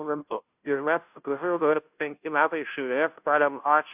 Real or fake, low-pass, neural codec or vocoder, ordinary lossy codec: fake; 3.6 kHz; codec, 16 kHz, 0.5 kbps, FunCodec, trained on Chinese and English, 25 frames a second; AAC, 32 kbps